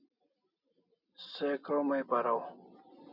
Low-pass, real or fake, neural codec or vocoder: 5.4 kHz; real; none